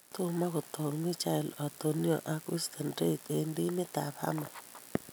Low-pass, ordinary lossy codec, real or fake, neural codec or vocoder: none; none; real; none